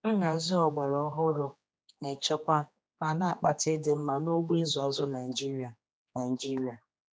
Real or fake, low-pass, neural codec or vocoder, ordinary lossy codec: fake; none; codec, 16 kHz, 2 kbps, X-Codec, HuBERT features, trained on general audio; none